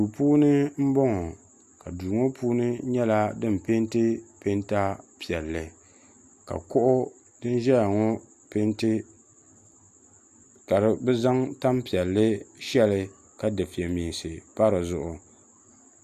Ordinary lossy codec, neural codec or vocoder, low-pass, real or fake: Opus, 64 kbps; none; 14.4 kHz; real